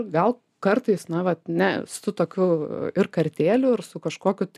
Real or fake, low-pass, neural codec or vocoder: real; 14.4 kHz; none